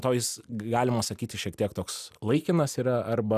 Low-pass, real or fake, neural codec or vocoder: 14.4 kHz; real; none